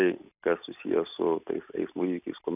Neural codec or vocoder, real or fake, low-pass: none; real; 3.6 kHz